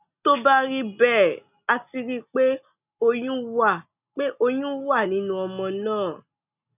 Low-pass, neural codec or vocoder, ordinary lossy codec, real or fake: 3.6 kHz; none; none; real